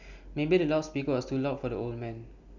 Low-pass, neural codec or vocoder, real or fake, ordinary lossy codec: 7.2 kHz; none; real; none